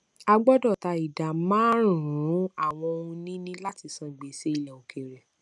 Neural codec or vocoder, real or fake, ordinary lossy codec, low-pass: none; real; none; none